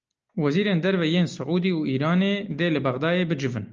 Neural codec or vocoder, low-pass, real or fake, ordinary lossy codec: none; 7.2 kHz; real; Opus, 24 kbps